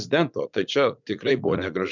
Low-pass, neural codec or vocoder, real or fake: 7.2 kHz; vocoder, 44.1 kHz, 80 mel bands, Vocos; fake